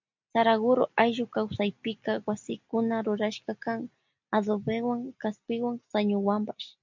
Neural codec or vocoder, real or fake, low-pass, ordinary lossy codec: none; real; 7.2 kHz; MP3, 64 kbps